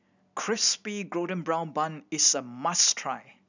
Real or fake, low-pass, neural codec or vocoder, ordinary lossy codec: real; 7.2 kHz; none; none